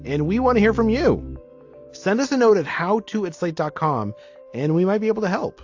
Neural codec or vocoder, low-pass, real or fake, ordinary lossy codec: none; 7.2 kHz; real; AAC, 48 kbps